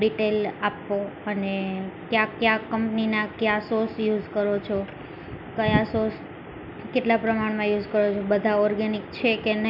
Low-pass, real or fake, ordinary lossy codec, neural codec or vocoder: 5.4 kHz; real; none; none